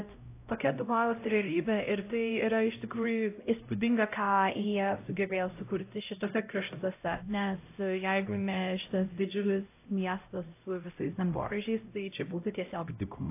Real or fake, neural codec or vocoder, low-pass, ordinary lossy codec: fake; codec, 16 kHz, 0.5 kbps, X-Codec, HuBERT features, trained on LibriSpeech; 3.6 kHz; AAC, 24 kbps